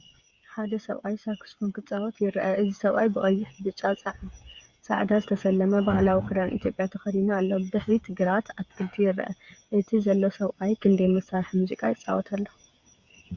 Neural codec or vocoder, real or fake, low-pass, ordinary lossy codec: codec, 16 kHz, 8 kbps, FreqCodec, smaller model; fake; 7.2 kHz; Opus, 64 kbps